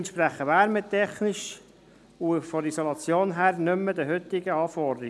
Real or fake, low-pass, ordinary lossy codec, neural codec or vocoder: real; none; none; none